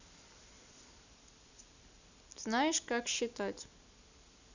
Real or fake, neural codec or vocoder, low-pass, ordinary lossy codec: real; none; 7.2 kHz; none